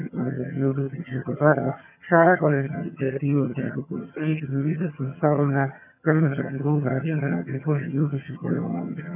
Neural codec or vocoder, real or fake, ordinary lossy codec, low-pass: vocoder, 22.05 kHz, 80 mel bands, HiFi-GAN; fake; none; 3.6 kHz